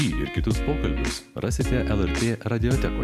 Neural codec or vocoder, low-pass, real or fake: none; 14.4 kHz; real